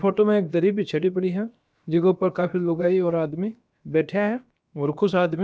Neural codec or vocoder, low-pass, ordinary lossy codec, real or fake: codec, 16 kHz, about 1 kbps, DyCAST, with the encoder's durations; none; none; fake